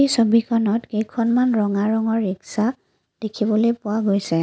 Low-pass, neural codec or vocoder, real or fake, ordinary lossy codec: none; none; real; none